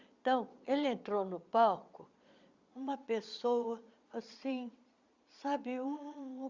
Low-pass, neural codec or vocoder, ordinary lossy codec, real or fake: 7.2 kHz; vocoder, 22.05 kHz, 80 mel bands, Vocos; Opus, 64 kbps; fake